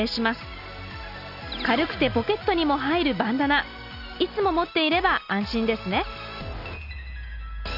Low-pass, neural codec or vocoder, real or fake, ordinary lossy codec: 5.4 kHz; none; real; Opus, 64 kbps